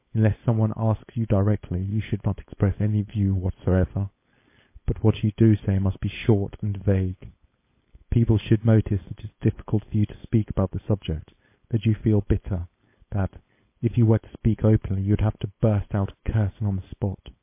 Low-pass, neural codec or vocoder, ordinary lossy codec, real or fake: 3.6 kHz; codec, 16 kHz, 4.8 kbps, FACodec; MP3, 24 kbps; fake